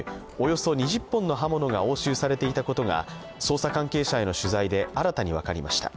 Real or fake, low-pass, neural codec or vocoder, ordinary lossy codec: real; none; none; none